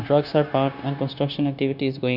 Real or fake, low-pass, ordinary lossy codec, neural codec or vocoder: fake; 5.4 kHz; none; codec, 16 kHz, 0.9 kbps, LongCat-Audio-Codec